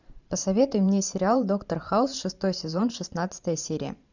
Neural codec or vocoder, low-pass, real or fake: vocoder, 44.1 kHz, 128 mel bands every 512 samples, BigVGAN v2; 7.2 kHz; fake